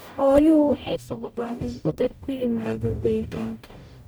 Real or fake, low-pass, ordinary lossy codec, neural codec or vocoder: fake; none; none; codec, 44.1 kHz, 0.9 kbps, DAC